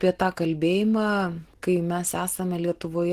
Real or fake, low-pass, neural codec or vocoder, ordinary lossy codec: real; 14.4 kHz; none; Opus, 16 kbps